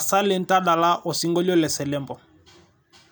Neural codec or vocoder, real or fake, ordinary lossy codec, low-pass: none; real; none; none